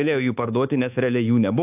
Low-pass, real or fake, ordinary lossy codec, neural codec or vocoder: 3.6 kHz; fake; AAC, 32 kbps; codec, 24 kHz, 1.2 kbps, DualCodec